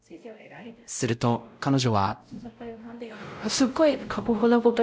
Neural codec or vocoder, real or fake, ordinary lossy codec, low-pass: codec, 16 kHz, 0.5 kbps, X-Codec, WavLM features, trained on Multilingual LibriSpeech; fake; none; none